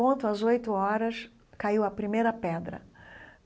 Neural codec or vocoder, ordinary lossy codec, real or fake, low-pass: none; none; real; none